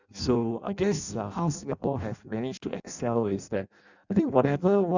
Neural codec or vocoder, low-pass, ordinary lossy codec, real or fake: codec, 16 kHz in and 24 kHz out, 0.6 kbps, FireRedTTS-2 codec; 7.2 kHz; none; fake